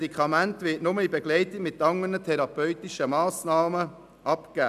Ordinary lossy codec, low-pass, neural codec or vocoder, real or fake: none; 14.4 kHz; none; real